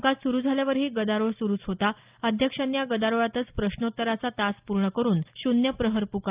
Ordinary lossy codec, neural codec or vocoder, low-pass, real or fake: Opus, 32 kbps; none; 3.6 kHz; real